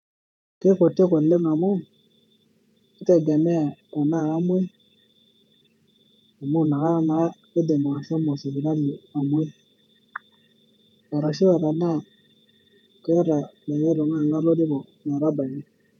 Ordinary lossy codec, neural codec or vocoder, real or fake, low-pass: none; vocoder, 48 kHz, 128 mel bands, Vocos; fake; 19.8 kHz